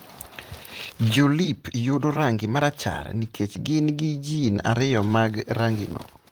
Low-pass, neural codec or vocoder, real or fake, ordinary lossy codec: 19.8 kHz; vocoder, 44.1 kHz, 128 mel bands, Pupu-Vocoder; fake; Opus, 24 kbps